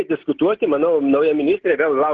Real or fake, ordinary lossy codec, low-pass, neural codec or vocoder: real; Opus, 16 kbps; 7.2 kHz; none